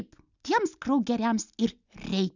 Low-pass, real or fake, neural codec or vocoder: 7.2 kHz; real; none